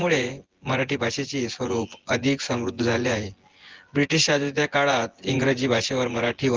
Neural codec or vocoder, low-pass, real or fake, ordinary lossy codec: vocoder, 24 kHz, 100 mel bands, Vocos; 7.2 kHz; fake; Opus, 16 kbps